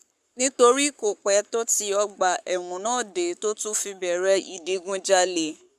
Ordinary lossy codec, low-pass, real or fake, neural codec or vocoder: none; 14.4 kHz; fake; codec, 44.1 kHz, 7.8 kbps, Pupu-Codec